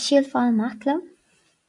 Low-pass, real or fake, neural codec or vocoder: 10.8 kHz; real; none